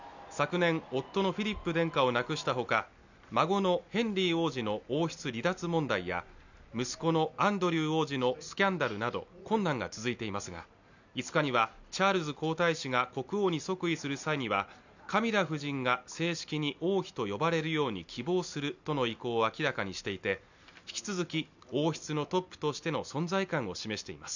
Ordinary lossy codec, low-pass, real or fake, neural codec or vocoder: none; 7.2 kHz; real; none